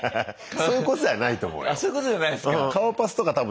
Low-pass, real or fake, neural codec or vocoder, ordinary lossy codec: none; real; none; none